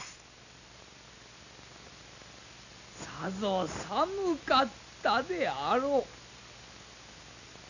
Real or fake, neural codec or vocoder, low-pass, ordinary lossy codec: real; none; 7.2 kHz; none